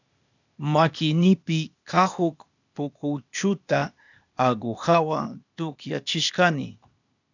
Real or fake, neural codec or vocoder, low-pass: fake; codec, 16 kHz, 0.8 kbps, ZipCodec; 7.2 kHz